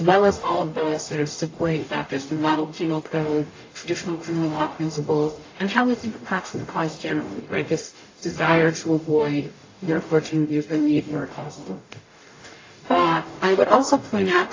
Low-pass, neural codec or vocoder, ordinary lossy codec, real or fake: 7.2 kHz; codec, 44.1 kHz, 0.9 kbps, DAC; AAC, 32 kbps; fake